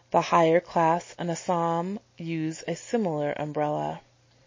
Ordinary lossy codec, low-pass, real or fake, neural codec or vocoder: MP3, 32 kbps; 7.2 kHz; real; none